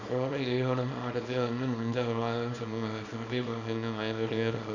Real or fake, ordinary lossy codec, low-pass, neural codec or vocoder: fake; none; 7.2 kHz; codec, 24 kHz, 0.9 kbps, WavTokenizer, small release